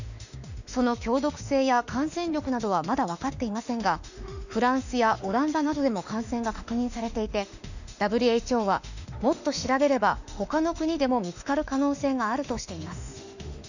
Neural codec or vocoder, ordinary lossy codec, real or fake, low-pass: autoencoder, 48 kHz, 32 numbers a frame, DAC-VAE, trained on Japanese speech; none; fake; 7.2 kHz